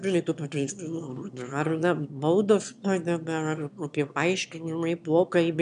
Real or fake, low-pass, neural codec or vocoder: fake; 9.9 kHz; autoencoder, 22.05 kHz, a latent of 192 numbers a frame, VITS, trained on one speaker